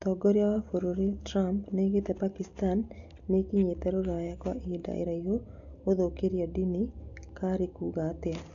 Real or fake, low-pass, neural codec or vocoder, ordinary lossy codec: real; 7.2 kHz; none; none